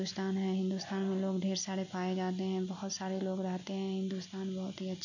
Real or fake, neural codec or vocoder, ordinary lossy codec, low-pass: real; none; none; 7.2 kHz